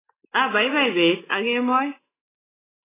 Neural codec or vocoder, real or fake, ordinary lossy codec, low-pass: none; real; AAC, 16 kbps; 3.6 kHz